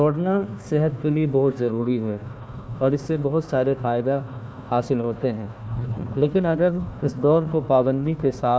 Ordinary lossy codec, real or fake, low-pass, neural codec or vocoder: none; fake; none; codec, 16 kHz, 1 kbps, FunCodec, trained on Chinese and English, 50 frames a second